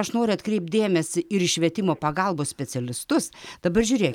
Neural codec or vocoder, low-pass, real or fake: none; 19.8 kHz; real